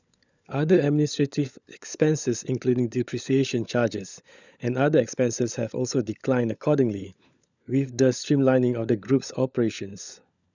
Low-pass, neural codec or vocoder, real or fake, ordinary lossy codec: 7.2 kHz; codec, 16 kHz, 16 kbps, FunCodec, trained on LibriTTS, 50 frames a second; fake; none